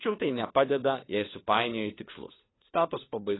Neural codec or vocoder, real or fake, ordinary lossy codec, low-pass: codec, 16 kHz, 4 kbps, FunCodec, trained on LibriTTS, 50 frames a second; fake; AAC, 16 kbps; 7.2 kHz